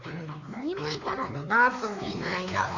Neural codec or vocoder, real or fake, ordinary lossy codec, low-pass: codec, 16 kHz, 2 kbps, X-Codec, WavLM features, trained on Multilingual LibriSpeech; fake; Opus, 64 kbps; 7.2 kHz